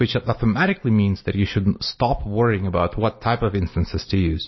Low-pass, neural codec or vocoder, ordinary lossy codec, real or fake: 7.2 kHz; none; MP3, 24 kbps; real